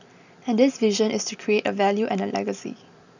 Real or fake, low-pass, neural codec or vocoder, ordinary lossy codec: real; 7.2 kHz; none; none